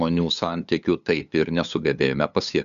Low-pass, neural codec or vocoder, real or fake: 7.2 kHz; codec, 16 kHz, 8 kbps, FunCodec, trained on LibriTTS, 25 frames a second; fake